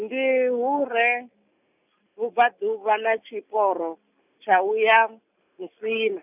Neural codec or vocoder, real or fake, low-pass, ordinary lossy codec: none; real; 3.6 kHz; none